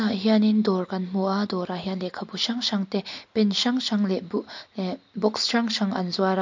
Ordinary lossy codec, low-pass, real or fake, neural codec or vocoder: MP3, 48 kbps; 7.2 kHz; real; none